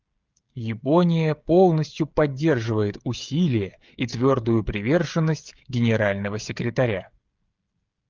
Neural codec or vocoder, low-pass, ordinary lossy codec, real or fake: codec, 16 kHz, 16 kbps, FreqCodec, smaller model; 7.2 kHz; Opus, 32 kbps; fake